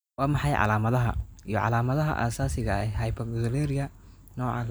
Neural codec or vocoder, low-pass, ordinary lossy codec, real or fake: none; none; none; real